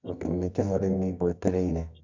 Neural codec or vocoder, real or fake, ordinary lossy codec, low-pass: codec, 24 kHz, 0.9 kbps, WavTokenizer, medium music audio release; fake; none; 7.2 kHz